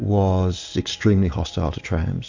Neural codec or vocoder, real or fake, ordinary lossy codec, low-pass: none; real; AAC, 48 kbps; 7.2 kHz